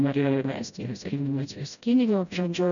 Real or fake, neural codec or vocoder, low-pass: fake; codec, 16 kHz, 0.5 kbps, FreqCodec, smaller model; 7.2 kHz